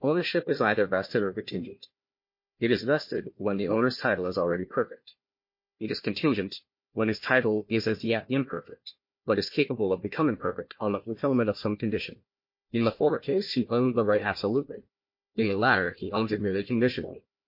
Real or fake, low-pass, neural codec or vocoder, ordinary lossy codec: fake; 5.4 kHz; codec, 16 kHz, 1 kbps, FunCodec, trained on Chinese and English, 50 frames a second; MP3, 32 kbps